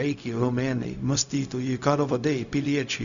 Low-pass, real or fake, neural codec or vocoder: 7.2 kHz; fake; codec, 16 kHz, 0.4 kbps, LongCat-Audio-Codec